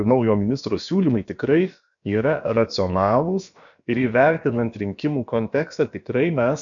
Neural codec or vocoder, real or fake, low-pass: codec, 16 kHz, about 1 kbps, DyCAST, with the encoder's durations; fake; 7.2 kHz